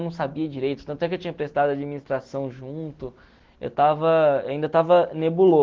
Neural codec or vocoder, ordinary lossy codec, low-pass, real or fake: none; Opus, 16 kbps; 7.2 kHz; real